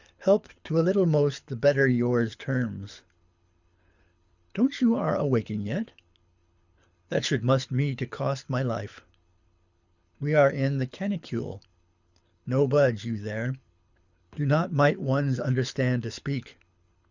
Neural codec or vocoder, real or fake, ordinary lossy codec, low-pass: codec, 24 kHz, 6 kbps, HILCodec; fake; Opus, 64 kbps; 7.2 kHz